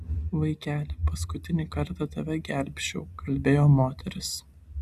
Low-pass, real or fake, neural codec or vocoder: 14.4 kHz; real; none